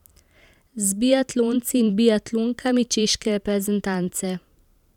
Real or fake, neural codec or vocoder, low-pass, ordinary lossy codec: fake; vocoder, 44.1 kHz, 128 mel bands every 512 samples, BigVGAN v2; 19.8 kHz; none